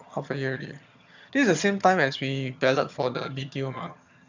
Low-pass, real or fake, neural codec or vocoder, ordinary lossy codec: 7.2 kHz; fake; vocoder, 22.05 kHz, 80 mel bands, HiFi-GAN; none